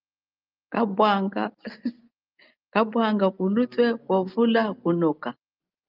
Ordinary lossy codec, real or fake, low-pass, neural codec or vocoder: Opus, 24 kbps; real; 5.4 kHz; none